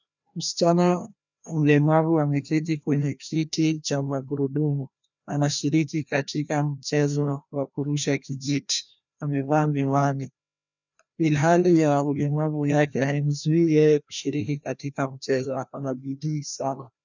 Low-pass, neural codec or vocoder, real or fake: 7.2 kHz; codec, 16 kHz, 1 kbps, FreqCodec, larger model; fake